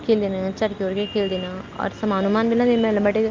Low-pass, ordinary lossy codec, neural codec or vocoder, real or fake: 7.2 kHz; Opus, 24 kbps; none; real